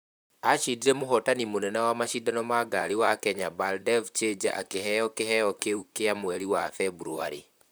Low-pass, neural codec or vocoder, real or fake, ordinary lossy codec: none; vocoder, 44.1 kHz, 128 mel bands, Pupu-Vocoder; fake; none